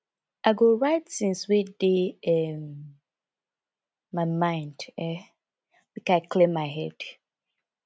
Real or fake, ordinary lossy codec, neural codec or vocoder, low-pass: real; none; none; none